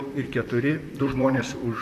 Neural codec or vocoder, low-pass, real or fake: vocoder, 44.1 kHz, 128 mel bands, Pupu-Vocoder; 14.4 kHz; fake